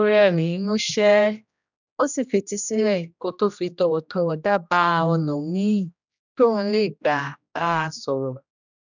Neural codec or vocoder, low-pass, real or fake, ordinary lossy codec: codec, 16 kHz, 1 kbps, X-Codec, HuBERT features, trained on general audio; 7.2 kHz; fake; none